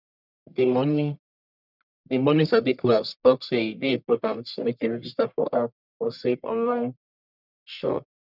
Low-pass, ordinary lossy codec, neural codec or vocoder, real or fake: 5.4 kHz; none; codec, 44.1 kHz, 1.7 kbps, Pupu-Codec; fake